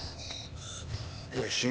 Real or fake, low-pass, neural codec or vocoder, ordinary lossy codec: fake; none; codec, 16 kHz, 0.8 kbps, ZipCodec; none